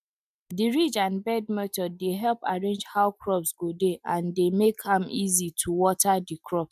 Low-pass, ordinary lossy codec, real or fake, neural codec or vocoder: 14.4 kHz; none; real; none